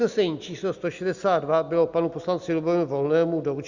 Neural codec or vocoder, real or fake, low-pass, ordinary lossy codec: none; real; 7.2 kHz; Opus, 64 kbps